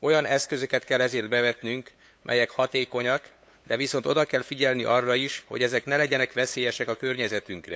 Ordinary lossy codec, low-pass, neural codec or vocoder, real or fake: none; none; codec, 16 kHz, 8 kbps, FunCodec, trained on LibriTTS, 25 frames a second; fake